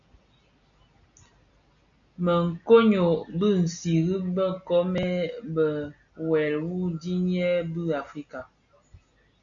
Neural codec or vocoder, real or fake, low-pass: none; real; 7.2 kHz